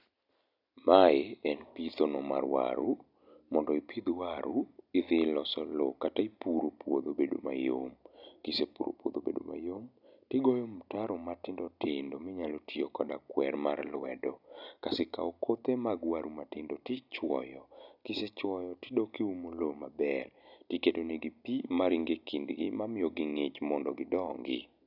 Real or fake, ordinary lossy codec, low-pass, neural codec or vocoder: real; none; 5.4 kHz; none